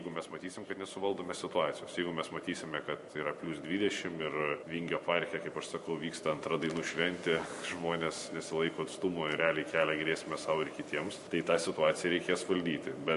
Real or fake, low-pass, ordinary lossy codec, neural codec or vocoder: fake; 14.4 kHz; MP3, 48 kbps; vocoder, 44.1 kHz, 128 mel bands every 512 samples, BigVGAN v2